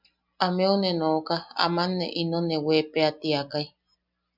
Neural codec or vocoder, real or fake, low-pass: none; real; 5.4 kHz